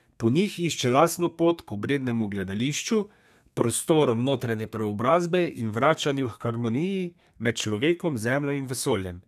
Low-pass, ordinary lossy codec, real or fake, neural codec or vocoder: 14.4 kHz; none; fake; codec, 32 kHz, 1.9 kbps, SNAC